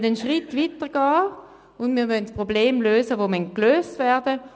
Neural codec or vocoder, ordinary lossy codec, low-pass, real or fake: none; none; none; real